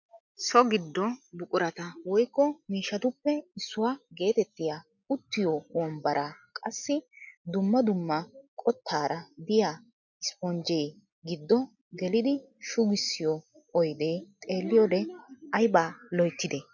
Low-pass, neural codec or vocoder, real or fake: 7.2 kHz; none; real